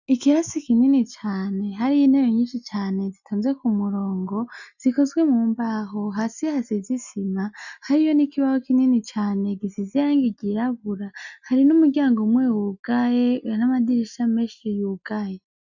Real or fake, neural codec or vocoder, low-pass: real; none; 7.2 kHz